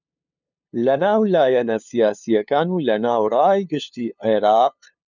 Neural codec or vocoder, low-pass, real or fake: codec, 16 kHz, 2 kbps, FunCodec, trained on LibriTTS, 25 frames a second; 7.2 kHz; fake